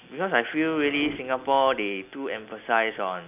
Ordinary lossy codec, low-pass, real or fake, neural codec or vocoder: none; 3.6 kHz; real; none